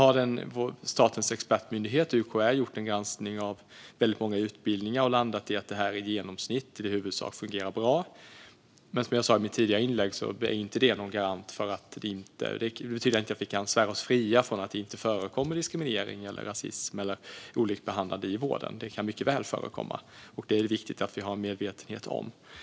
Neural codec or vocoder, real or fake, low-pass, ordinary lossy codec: none; real; none; none